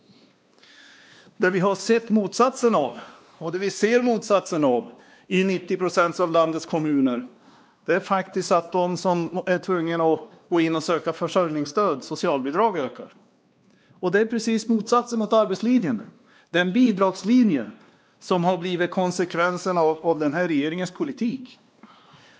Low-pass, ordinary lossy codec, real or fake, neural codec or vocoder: none; none; fake; codec, 16 kHz, 2 kbps, X-Codec, WavLM features, trained on Multilingual LibriSpeech